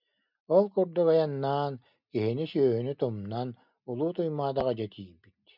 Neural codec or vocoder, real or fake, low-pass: none; real; 5.4 kHz